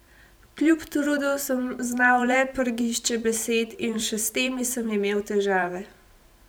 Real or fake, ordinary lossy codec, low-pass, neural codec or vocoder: fake; none; none; vocoder, 44.1 kHz, 128 mel bands every 512 samples, BigVGAN v2